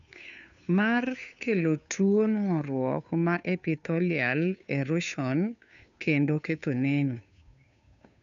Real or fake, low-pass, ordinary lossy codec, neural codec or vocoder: fake; 7.2 kHz; none; codec, 16 kHz, 2 kbps, FunCodec, trained on Chinese and English, 25 frames a second